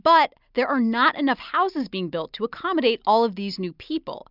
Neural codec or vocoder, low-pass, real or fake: none; 5.4 kHz; real